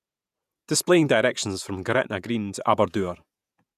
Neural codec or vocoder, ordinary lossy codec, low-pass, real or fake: vocoder, 48 kHz, 128 mel bands, Vocos; none; 14.4 kHz; fake